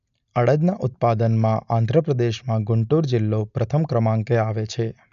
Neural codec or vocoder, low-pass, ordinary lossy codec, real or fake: none; 7.2 kHz; none; real